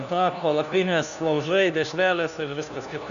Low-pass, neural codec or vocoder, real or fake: 7.2 kHz; codec, 16 kHz, 1 kbps, FunCodec, trained on LibriTTS, 50 frames a second; fake